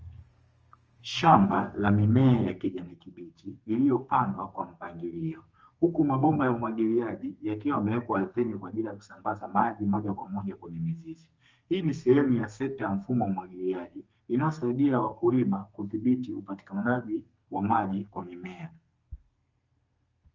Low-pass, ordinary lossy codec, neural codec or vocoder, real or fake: 7.2 kHz; Opus, 24 kbps; codec, 32 kHz, 1.9 kbps, SNAC; fake